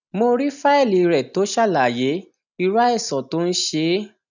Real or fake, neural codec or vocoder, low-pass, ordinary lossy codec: real; none; 7.2 kHz; none